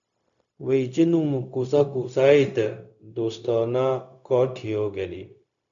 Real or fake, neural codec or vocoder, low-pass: fake; codec, 16 kHz, 0.4 kbps, LongCat-Audio-Codec; 7.2 kHz